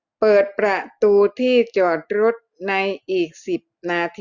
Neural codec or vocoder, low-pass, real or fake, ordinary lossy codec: none; 7.2 kHz; real; Opus, 64 kbps